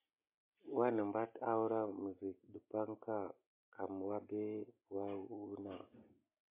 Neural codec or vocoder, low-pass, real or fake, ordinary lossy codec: none; 3.6 kHz; real; MP3, 24 kbps